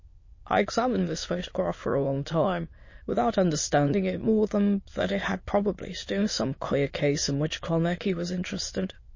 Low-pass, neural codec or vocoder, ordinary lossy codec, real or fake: 7.2 kHz; autoencoder, 22.05 kHz, a latent of 192 numbers a frame, VITS, trained on many speakers; MP3, 32 kbps; fake